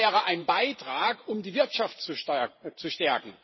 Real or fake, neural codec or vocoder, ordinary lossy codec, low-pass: fake; vocoder, 44.1 kHz, 128 mel bands every 512 samples, BigVGAN v2; MP3, 24 kbps; 7.2 kHz